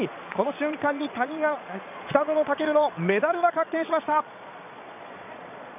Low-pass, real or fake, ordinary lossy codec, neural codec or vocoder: 3.6 kHz; fake; none; vocoder, 22.05 kHz, 80 mel bands, Vocos